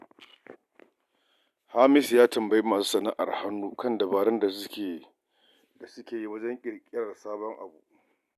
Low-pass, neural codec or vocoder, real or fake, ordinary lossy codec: 14.4 kHz; none; real; none